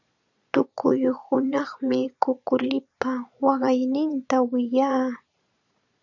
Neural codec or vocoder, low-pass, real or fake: vocoder, 22.05 kHz, 80 mel bands, Vocos; 7.2 kHz; fake